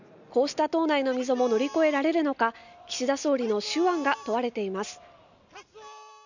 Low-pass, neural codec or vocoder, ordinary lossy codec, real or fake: 7.2 kHz; none; none; real